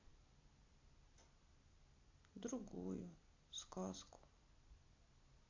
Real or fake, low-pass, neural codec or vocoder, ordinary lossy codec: real; 7.2 kHz; none; AAC, 48 kbps